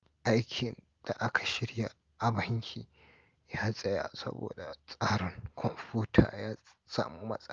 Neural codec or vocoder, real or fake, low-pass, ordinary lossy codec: none; real; 7.2 kHz; Opus, 32 kbps